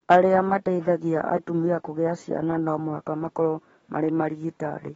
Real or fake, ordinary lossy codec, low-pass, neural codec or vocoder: fake; AAC, 24 kbps; 19.8 kHz; codec, 44.1 kHz, 7.8 kbps, DAC